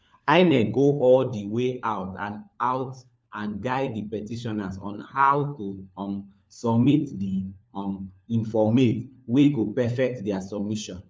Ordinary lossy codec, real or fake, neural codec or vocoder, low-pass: none; fake; codec, 16 kHz, 4 kbps, FunCodec, trained on LibriTTS, 50 frames a second; none